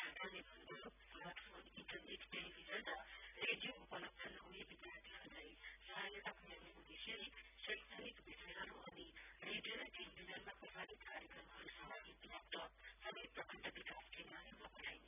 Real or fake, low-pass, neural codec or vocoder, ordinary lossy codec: real; 3.6 kHz; none; none